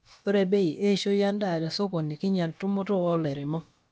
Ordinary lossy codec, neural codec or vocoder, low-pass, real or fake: none; codec, 16 kHz, about 1 kbps, DyCAST, with the encoder's durations; none; fake